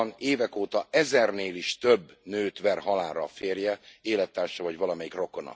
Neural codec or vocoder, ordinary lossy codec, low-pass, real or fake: none; none; none; real